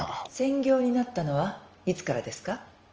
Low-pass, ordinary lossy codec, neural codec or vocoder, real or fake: 7.2 kHz; Opus, 24 kbps; none; real